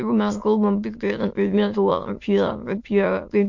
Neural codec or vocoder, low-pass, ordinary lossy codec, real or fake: autoencoder, 22.05 kHz, a latent of 192 numbers a frame, VITS, trained on many speakers; 7.2 kHz; MP3, 48 kbps; fake